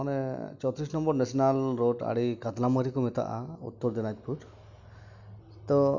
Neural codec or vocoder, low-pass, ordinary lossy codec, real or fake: none; 7.2 kHz; none; real